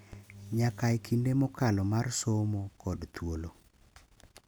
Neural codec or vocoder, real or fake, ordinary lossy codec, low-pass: none; real; none; none